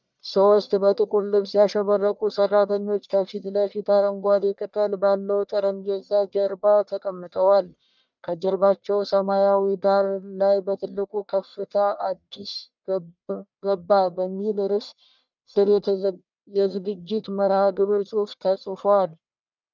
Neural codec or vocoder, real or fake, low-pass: codec, 44.1 kHz, 1.7 kbps, Pupu-Codec; fake; 7.2 kHz